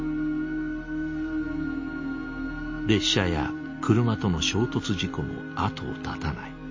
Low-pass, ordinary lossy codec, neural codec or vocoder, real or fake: 7.2 kHz; MP3, 32 kbps; none; real